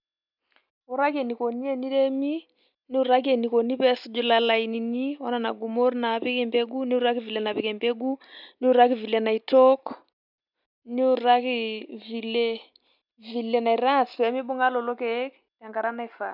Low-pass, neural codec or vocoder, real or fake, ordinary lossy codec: 5.4 kHz; none; real; none